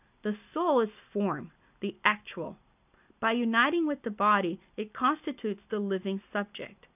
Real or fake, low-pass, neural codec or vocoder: real; 3.6 kHz; none